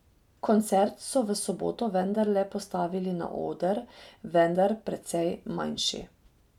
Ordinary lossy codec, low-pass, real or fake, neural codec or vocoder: none; 19.8 kHz; real; none